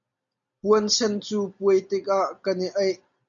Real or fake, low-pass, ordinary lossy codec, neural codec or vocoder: real; 7.2 kHz; MP3, 64 kbps; none